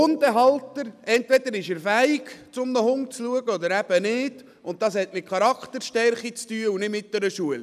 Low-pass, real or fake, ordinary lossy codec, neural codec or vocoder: 14.4 kHz; real; none; none